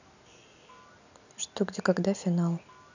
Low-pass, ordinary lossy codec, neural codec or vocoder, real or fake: 7.2 kHz; none; none; real